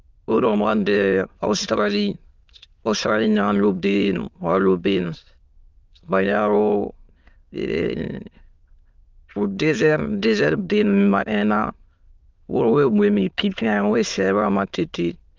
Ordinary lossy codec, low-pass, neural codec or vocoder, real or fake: Opus, 32 kbps; 7.2 kHz; autoencoder, 22.05 kHz, a latent of 192 numbers a frame, VITS, trained on many speakers; fake